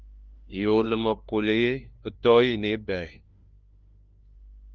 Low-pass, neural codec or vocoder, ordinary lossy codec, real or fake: 7.2 kHz; codec, 16 kHz, 1 kbps, FunCodec, trained on LibriTTS, 50 frames a second; Opus, 24 kbps; fake